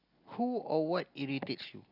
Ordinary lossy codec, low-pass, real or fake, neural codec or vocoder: none; 5.4 kHz; real; none